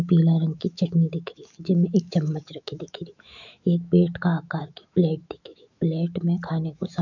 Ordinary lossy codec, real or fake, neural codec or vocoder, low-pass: none; fake; autoencoder, 48 kHz, 128 numbers a frame, DAC-VAE, trained on Japanese speech; 7.2 kHz